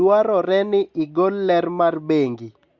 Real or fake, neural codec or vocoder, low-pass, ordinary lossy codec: real; none; 7.2 kHz; Opus, 64 kbps